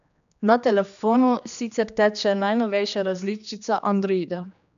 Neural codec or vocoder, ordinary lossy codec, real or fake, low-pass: codec, 16 kHz, 2 kbps, X-Codec, HuBERT features, trained on general audio; none; fake; 7.2 kHz